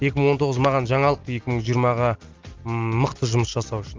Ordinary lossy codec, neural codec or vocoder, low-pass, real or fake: Opus, 32 kbps; none; 7.2 kHz; real